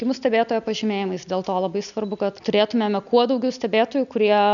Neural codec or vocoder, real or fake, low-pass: none; real; 7.2 kHz